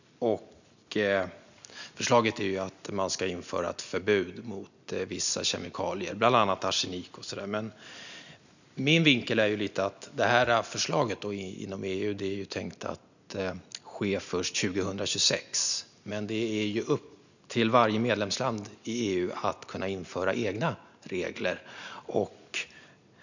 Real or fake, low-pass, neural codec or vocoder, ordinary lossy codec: real; 7.2 kHz; none; none